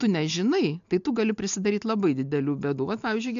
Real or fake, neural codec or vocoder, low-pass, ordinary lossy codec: real; none; 7.2 kHz; MP3, 64 kbps